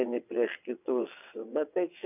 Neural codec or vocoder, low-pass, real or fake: vocoder, 22.05 kHz, 80 mel bands, WaveNeXt; 3.6 kHz; fake